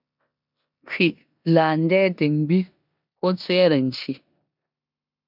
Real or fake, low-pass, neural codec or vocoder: fake; 5.4 kHz; codec, 16 kHz in and 24 kHz out, 0.9 kbps, LongCat-Audio-Codec, four codebook decoder